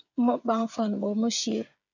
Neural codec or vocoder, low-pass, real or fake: codec, 16 kHz, 8 kbps, FreqCodec, smaller model; 7.2 kHz; fake